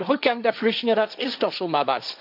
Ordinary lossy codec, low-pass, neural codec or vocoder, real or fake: none; 5.4 kHz; codec, 16 kHz, 1.1 kbps, Voila-Tokenizer; fake